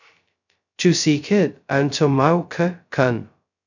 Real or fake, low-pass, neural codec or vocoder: fake; 7.2 kHz; codec, 16 kHz, 0.2 kbps, FocalCodec